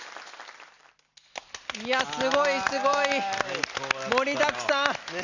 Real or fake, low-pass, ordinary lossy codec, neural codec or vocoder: real; 7.2 kHz; none; none